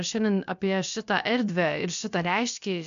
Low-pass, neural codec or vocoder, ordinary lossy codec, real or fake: 7.2 kHz; codec, 16 kHz, about 1 kbps, DyCAST, with the encoder's durations; MP3, 64 kbps; fake